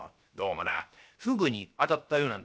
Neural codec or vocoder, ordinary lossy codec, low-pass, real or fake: codec, 16 kHz, 0.7 kbps, FocalCodec; none; none; fake